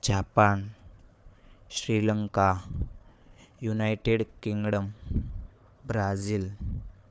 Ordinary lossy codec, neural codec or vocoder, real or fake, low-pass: none; codec, 16 kHz, 4 kbps, FunCodec, trained on Chinese and English, 50 frames a second; fake; none